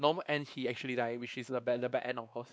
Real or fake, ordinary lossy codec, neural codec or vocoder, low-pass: fake; none; codec, 16 kHz, 2 kbps, X-Codec, WavLM features, trained on Multilingual LibriSpeech; none